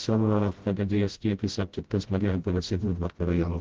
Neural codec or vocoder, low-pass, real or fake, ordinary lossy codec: codec, 16 kHz, 0.5 kbps, FreqCodec, smaller model; 7.2 kHz; fake; Opus, 16 kbps